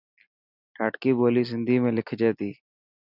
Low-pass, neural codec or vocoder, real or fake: 5.4 kHz; none; real